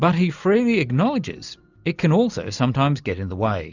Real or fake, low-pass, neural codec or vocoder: real; 7.2 kHz; none